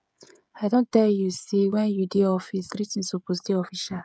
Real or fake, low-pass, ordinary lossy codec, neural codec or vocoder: fake; none; none; codec, 16 kHz, 16 kbps, FreqCodec, smaller model